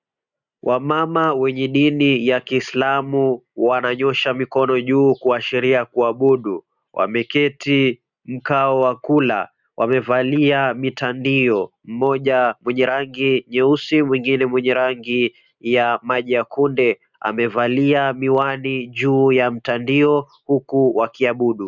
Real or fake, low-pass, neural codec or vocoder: real; 7.2 kHz; none